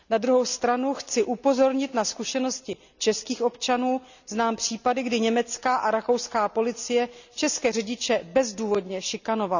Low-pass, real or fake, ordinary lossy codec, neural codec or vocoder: 7.2 kHz; real; none; none